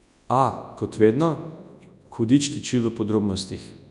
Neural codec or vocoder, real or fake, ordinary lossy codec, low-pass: codec, 24 kHz, 0.9 kbps, WavTokenizer, large speech release; fake; none; 10.8 kHz